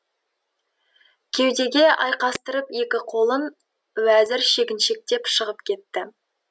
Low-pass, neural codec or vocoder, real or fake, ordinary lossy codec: none; none; real; none